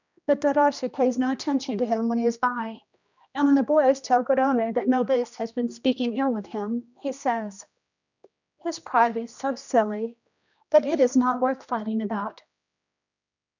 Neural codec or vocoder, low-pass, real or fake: codec, 16 kHz, 1 kbps, X-Codec, HuBERT features, trained on general audio; 7.2 kHz; fake